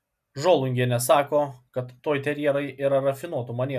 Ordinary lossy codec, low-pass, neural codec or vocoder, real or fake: MP3, 96 kbps; 14.4 kHz; none; real